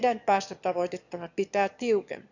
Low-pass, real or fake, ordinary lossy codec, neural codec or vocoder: 7.2 kHz; fake; none; autoencoder, 22.05 kHz, a latent of 192 numbers a frame, VITS, trained on one speaker